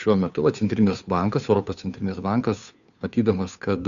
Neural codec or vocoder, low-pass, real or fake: codec, 16 kHz, 2 kbps, FunCodec, trained on Chinese and English, 25 frames a second; 7.2 kHz; fake